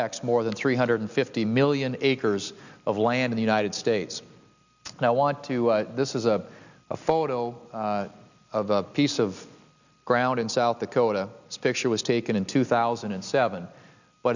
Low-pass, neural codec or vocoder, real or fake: 7.2 kHz; none; real